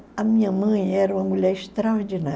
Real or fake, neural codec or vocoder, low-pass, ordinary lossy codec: real; none; none; none